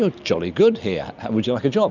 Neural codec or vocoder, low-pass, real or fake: none; 7.2 kHz; real